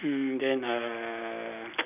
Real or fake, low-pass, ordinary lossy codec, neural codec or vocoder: real; 3.6 kHz; none; none